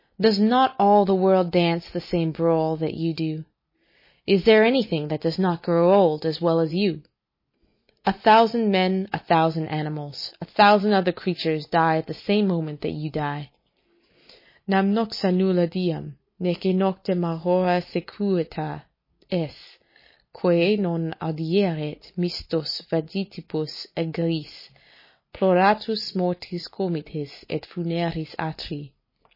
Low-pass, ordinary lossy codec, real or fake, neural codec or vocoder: 5.4 kHz; MP3, 24 kbps; real; none